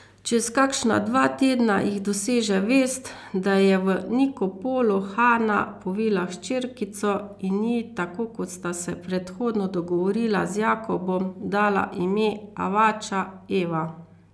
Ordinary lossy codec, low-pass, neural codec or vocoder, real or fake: none; none; none; real